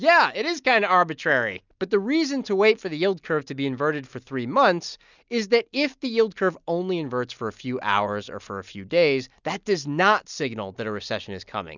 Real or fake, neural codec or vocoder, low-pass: real; none; 7.2 kHz